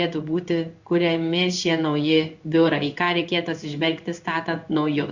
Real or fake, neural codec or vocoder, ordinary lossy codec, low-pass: fake; codec, 16 kHz in and 24 kHz out, 1 kbps, XY-Tokenizer; Opus, 64 kbps; 7.2 kHz